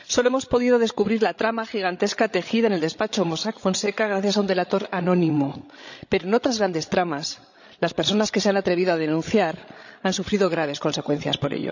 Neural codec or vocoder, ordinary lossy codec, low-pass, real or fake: codec, 16 kHz, 16 kbps, FreqCodec, larger model; none; 7.2 kHz; fake